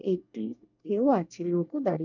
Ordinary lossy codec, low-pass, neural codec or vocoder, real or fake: none; 7.2 kHz; codec, 24 kHz, 1 kbps, SNAC; fake